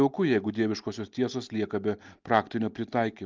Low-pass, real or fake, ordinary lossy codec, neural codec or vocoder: 7.2 kHz; real; Opus, 24 kbps; none